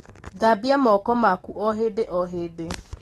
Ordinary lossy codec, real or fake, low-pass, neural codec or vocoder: AAC, 32 kbps; fake; 19.8 kHz; codec, 44.1 kHz, 7.8 kbps, DAC